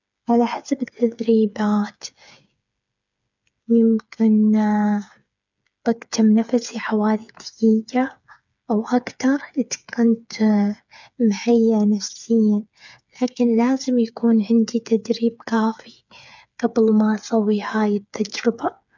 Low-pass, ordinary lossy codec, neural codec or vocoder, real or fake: 7.2 kHz; none; codec, 16 kHz, 8 kbps, FreqCodec, smaller model; fake